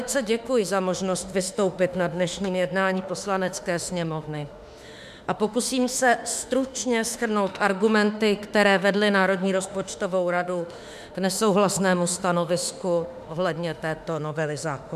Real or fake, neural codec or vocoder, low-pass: fake; autoencoder, 48 kHz, 32 numbers a frame, DAC-VAE, trained on Japanese speech; 14.4 kHz